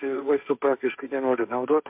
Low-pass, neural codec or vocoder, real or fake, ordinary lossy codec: 3.6 kHz; codec, 16 kHz, 1.1 kbps, Voila-Tokenizer; fake; MP3, 32 kbps